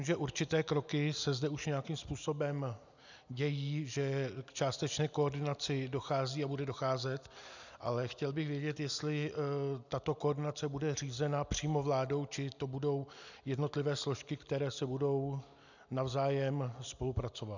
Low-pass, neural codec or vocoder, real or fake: 7.2 kHz; none; real